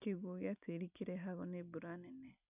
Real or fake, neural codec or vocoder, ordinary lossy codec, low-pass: real; none; none; 3.6 kHz